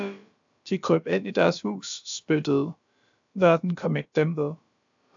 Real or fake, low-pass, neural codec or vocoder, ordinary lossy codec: fake; 7.2 kHz; codec, 16 kHz, about 1 kbps, DyCAST, with the encoder's durations; AAC, 64 kbps